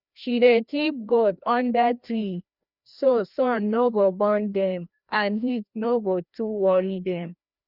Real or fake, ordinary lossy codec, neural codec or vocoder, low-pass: fake; none; codec, 16 kHz, 1 kbps, FreqCodec, larger model; 5.4 kHz